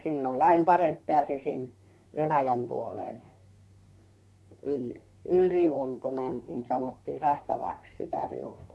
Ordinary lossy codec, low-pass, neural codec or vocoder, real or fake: none; none; codec, 24 kHz, 1 kbps, SNAC; fake